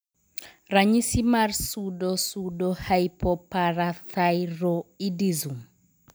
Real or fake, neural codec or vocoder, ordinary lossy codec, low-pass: real; none; none; none